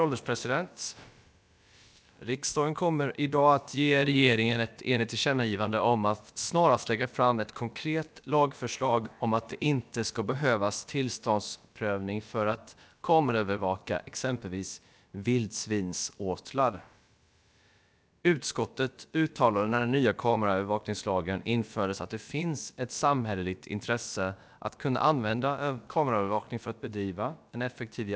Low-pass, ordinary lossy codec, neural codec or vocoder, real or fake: none; none; codec, 16 kHz, about 1 kbps, DyCAST, with the encoder's durations; fake